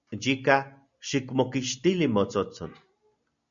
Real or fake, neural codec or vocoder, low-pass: real; none; 7.2 kHz